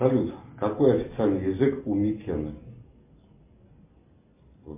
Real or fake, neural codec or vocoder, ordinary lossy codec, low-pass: real; none; MP3, 24 kbps; 3.6 kHz